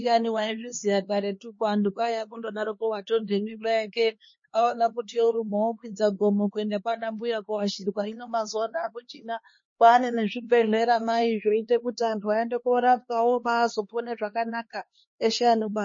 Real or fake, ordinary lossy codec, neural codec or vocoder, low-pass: fake; MP3, 32 kbps; codec, 16 kHz, 2 kbps, X-Codec, HuBERT features, trained on LibriSpeech; 7.2 kHz